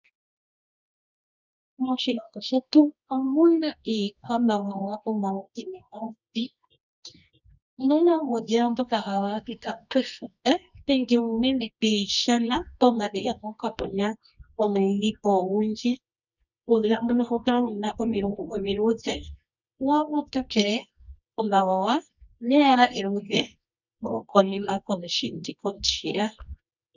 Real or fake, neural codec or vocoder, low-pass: fake; codec, 24 kHz, 0.9 kbps, WavTokenizer, medium music audio release; 7.2 kHz